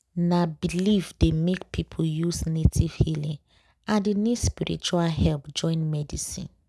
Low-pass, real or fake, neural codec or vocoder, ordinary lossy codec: none; real; none; none